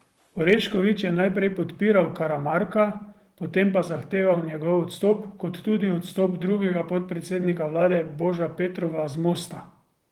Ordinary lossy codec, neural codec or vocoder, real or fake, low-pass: Opus, 24 kbps; vocoder, 44.1 kHz, 128 mel bands, Pupu-Vocoder; fake; 19.8 kHz